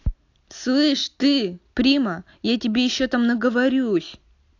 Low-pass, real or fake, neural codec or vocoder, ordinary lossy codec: 7.2 kHz; real; none; AAC, 48 kbps